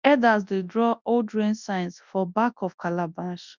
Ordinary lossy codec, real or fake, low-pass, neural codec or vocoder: none; fake; 7.2 kHz; codec, 24 kHz, 0.9 kbps, WavTokenizer, large speech release